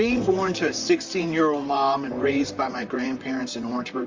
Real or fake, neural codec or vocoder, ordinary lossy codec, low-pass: fake; vocoder, 44.1 kHz, 128 mel bands, Pupu-Vocoder; Opus, 32 kbps; 7.2 kHz